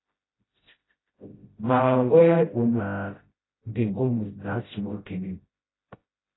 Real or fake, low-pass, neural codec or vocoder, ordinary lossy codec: fake; 7.2 kHz; codec, 16 kHz, 0.5 kbps, FreqCodec, smaller model; AAC, 16 kbps